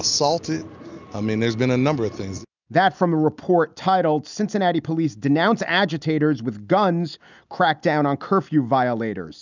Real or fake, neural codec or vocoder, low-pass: real; none; 7.2 kHz